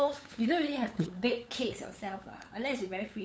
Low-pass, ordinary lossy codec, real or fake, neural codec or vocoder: none; none; fake; codec, 16 kHz, 8 kbps, FunCodec, trained on LibriTTS, 25 frames a second